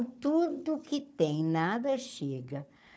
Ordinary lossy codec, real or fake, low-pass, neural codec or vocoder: none; fake; none; codec, 16 kHz, 4 kbps, FunCodec, trained on Chinese and English, 50 frames a second